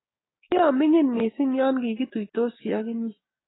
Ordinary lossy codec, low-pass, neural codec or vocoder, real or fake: AAC, 16 kbps; 7.2 kHz; codec, 16 kHz, 6 kbps, DAC; fake